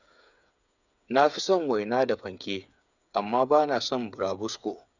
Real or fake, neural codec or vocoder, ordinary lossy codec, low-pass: fake; codec, 16 kHz, 8 kbps, FreqCodec, smaller model; none; 7.2 kHz